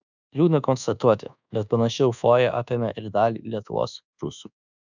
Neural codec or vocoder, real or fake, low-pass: codec, 24 kHz, 1.2 kbps, DualCodec; fake; 7.2 kHz